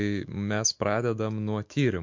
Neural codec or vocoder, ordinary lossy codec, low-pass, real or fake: autoencoder, 48 kHz, 128 numbers a frame, DAC-VAE, trained on Japanese speech; MP3, 48 kbps; 7.2 kHz; fake